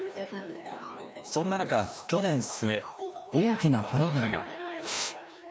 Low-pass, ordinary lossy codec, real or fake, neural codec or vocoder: none; none; fake; codec, 16 kHz, 1 kbps, FreqCodec, larger model